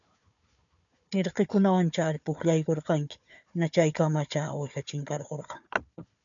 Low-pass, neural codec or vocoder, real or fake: 7.2 kHz; codec, 16 kHz, 4 kbps, FunCodec, trained on Chinese and English, 50 frames a second; fake